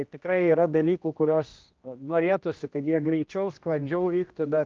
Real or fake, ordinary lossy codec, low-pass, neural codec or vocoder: fake; Opus, 24 kbps; 7.2 kHz; codec, 16 kHz, 1 kbps, X-Codec, HuBERT features, trained on general audio